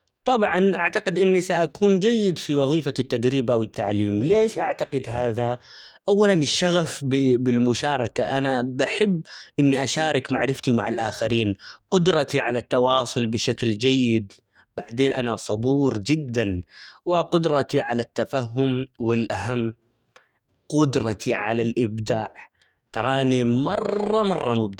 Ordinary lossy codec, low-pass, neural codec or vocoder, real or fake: none; 19.8 kHz; codec, 44.1 kHz, 2.6 kbps, DAC; fake